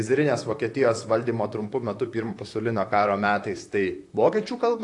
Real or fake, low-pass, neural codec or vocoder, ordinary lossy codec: fake; 10.8 kHz; vocoder, 44.1 kHz, 128 mel bands, Pupu-Vocoder; AAC, 48 kbps